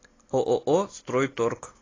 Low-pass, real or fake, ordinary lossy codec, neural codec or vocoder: 7.2 kHz; real; AAC, 32 kbps; none